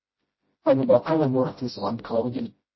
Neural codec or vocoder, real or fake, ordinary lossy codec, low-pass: codec, 16 kHz, 0.5 kbps, FreqCodec, smaller model; fake; MP3, 24 kbps; 7.2 kHz